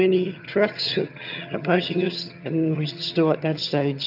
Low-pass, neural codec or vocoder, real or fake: 5.4 kHz; vocoder, 22.05 kHz, 80 mel bands, HiFi-GAN; fake